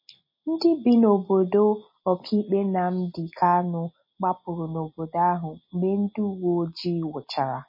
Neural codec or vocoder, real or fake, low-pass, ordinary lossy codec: none; real; 5.4 kHz; MP3, 24 kbps